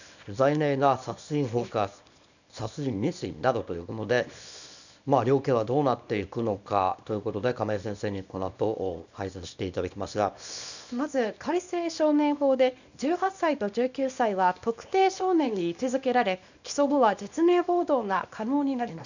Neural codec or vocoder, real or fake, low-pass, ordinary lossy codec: codec, 24 kHz, 0.9 kbps, WavTokenizer, small release; fake; 7.2 kHz; none